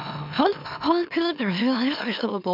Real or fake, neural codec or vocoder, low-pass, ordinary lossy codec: fake; autoencoder, 44.1 kHz, a latent of 192 numbers a frame, MeloTTS; 5.4 kHz; none